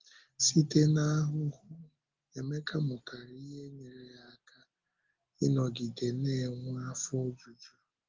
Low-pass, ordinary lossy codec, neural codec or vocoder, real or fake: 7.2 kHz; Opus, 16 kbps; none; real